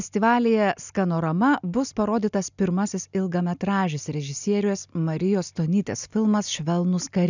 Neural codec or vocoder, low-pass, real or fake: none; 7.2 kHz; real